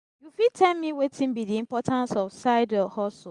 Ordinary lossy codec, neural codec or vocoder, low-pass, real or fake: Opus, 64 kbps; none; 10.8 kHz; real